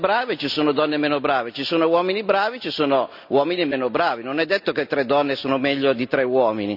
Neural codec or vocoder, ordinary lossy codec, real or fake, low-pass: none; none; real; 5.4 kHz